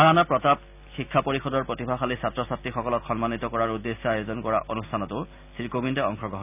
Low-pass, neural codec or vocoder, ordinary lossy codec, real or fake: 3.6 kHz; none; none; real